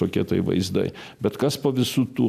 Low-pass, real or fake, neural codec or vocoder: 14.4 kHz; real; none